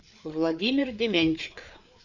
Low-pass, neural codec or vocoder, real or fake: 7.2 kHz; codec, 16 kHz, 4 kbps, FreqCodec, larger model; fake